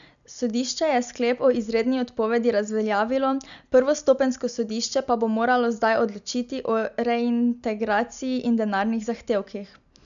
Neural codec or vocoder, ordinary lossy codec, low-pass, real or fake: none; MP3, 96 kbps; 7.2 kHz; real